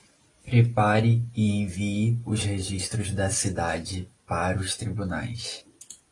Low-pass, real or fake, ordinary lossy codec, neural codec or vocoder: 10.8 kHz; real; AAC, 32 kbps; none